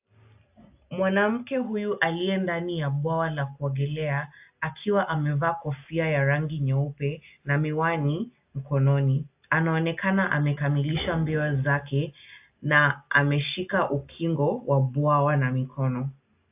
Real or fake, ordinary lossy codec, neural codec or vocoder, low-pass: real; AAC, 32 kbps; none; 3.6 kHz